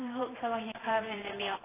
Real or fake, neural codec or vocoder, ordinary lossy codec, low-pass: fake; vocoder, 44.1 kHz, 128 mel bands every 512 samples, BigVGAN v2; AAC, 16 kbps; 3.6 kHz